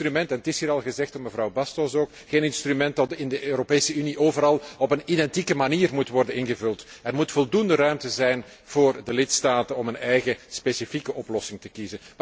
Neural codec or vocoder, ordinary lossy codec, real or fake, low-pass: none; none; real; none